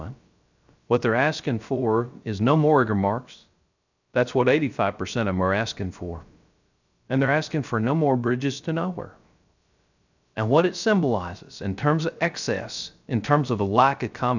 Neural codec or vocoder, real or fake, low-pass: codec, 16 kHz, 0.3 kbps, FocalCodec; fake; 7.2 kHz